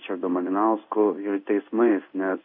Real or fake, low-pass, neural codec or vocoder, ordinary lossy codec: fake; 5.4 kHz; codec, 16 kHz in and 24 kHz out, 1 kbps, XY-Tokenizer; MP3, 32 kbps